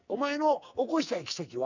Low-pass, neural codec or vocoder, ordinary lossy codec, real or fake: 7.2 kHz; codec, 44.1 kHz, 2.6 kbps, SNAC; none; fake